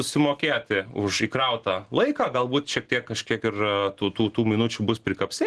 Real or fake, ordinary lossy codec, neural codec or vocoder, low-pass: real; Opus, 16 kbps; none; 10.8 kHz